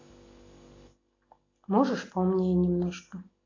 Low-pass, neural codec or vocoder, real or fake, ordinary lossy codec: 7.2 kHz; none; real; AAC, 32 kbps